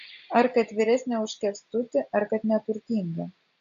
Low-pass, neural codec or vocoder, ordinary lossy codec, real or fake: 7.2 kHz; none; AAC, 64 kbps; real